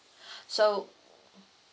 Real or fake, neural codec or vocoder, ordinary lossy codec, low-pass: real; none; none; none